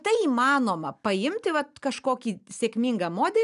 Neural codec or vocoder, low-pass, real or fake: none; 10.8 kHz; real